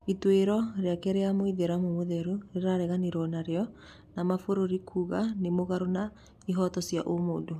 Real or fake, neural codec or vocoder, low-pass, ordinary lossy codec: real; none; 14.4 kHz; none